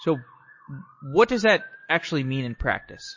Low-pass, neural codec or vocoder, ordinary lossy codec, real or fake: 7.2 kHz; none; MP3, 32 kbps; real